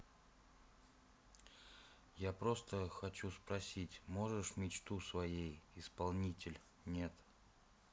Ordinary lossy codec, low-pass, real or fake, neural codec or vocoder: none; none; real; none